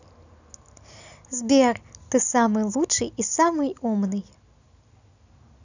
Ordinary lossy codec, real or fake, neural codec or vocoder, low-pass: none; real; none; 7.2 kHz